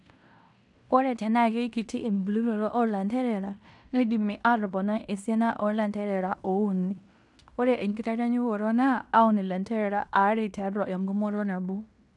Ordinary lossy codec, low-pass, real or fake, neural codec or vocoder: none; 10.8 kHz; fake; codec, 16 kHz in and 24 kHz out, 0.9 kbps, LongCat-Audio-Codec, fine tuned four codebook decoder